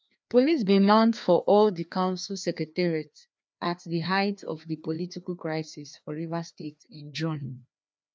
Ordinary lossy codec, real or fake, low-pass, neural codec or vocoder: none; fake; none; codec, 16 kHz, 2 kbps, FreqCodec, larger model